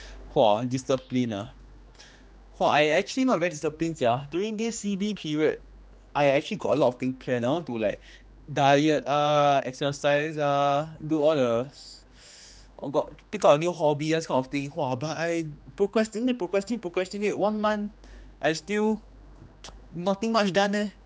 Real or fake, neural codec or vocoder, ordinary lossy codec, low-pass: fake; codec, 16 kHz, 2 kbps, X-Codec, HuBERT features, trained on general audio; none; none